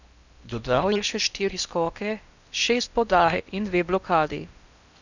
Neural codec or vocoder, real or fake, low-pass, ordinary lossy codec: codec, 16 kHz in and 24 kHz out, 0.8 kbps, FocalCodec, streaming, 65536 codes; fake; 7.2 kHz; none